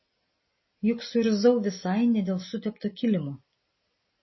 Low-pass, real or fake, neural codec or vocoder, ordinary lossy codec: 7.2 kHz; real; none; MP3, 24 kbps